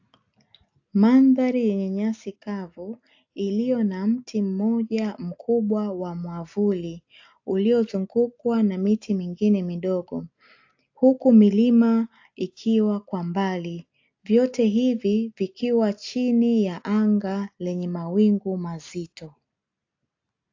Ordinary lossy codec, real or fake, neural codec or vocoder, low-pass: AAC, 48 kbps; real; none; 7.2 kHz